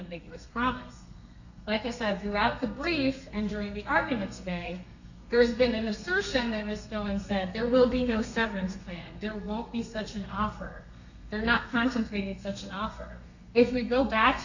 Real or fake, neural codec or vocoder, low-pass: fake; codec, 32 kHz, 1.9 kbps, SNAC; 7.2 kHz